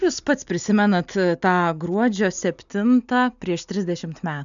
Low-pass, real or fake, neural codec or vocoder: 7.2 kHz; real; none